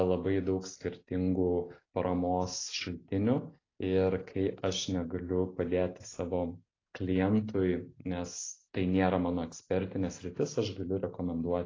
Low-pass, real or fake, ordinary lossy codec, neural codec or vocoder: 7.2 kHz; real; AAC, 32 kbps; none